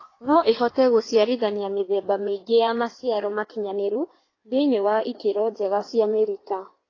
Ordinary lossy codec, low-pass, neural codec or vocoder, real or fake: AAC, 32 kbps; 7.2 kHz; codec, 16 kHz in and 24 kHz out, 1.1 kbps, FireRedTTS-2 codec; fake